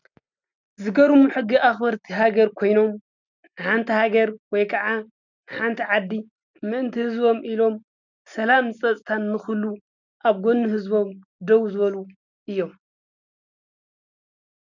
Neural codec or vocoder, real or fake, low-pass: none; real; 7.2 kHz